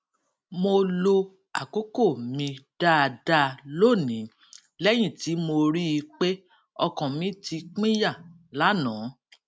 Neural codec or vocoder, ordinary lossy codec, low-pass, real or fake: none; none; none; real